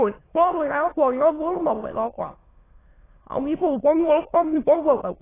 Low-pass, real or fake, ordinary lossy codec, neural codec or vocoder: 3.6 kHz; fake; AAC, 16 kbps; autoencoder, 22.05 kHz, a latent of 192 numbers a frame, VITS, trained on many speakers